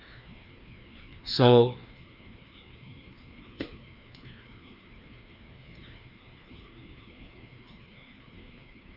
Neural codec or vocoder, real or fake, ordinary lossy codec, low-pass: codec, 16 kHz, 2 kbps, FreqCodec, larger model; fake; none; 5.4 kHz